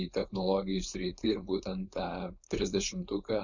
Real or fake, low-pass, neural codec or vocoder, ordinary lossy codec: fake; 7.2 kHz; codec, 16 kHz, 4.8 kbps, FACodec; Opus, 64 kbps